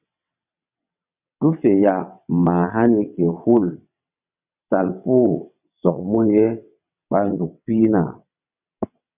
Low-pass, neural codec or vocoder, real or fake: 3.6 kHz; vocoder, 22.05 kHz, 80 mel bands, WaveNeXt; fake